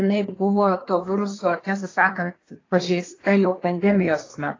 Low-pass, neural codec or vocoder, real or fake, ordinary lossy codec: 7.2 kHz; codec, 24 kHz, 1 kbps, SNAC; fake; AAC, 32 kbps